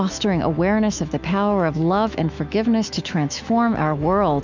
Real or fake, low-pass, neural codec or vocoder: fake; 7.2 kHz; autoencoder, 48 kHz, 128 numbers a frame, DAC-VAE, trained on Japanese speech